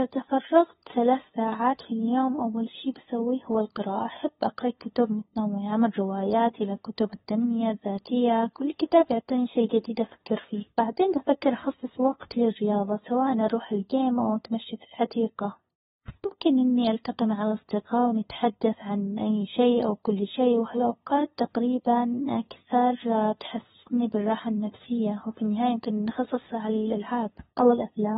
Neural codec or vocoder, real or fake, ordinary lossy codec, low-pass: codec, 16 kHz, 2 kbps, FunCodec, trained on LibriTTS, 25 frames a second; fake; AAC, 16 kbps; 7.2 kHz